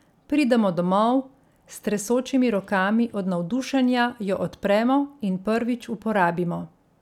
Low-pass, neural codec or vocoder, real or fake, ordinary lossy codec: 19.8 kHz; none; real; none